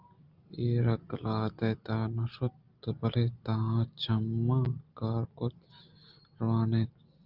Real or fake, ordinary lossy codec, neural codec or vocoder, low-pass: real; Opus, 24 kbps; none; 5.4 kHz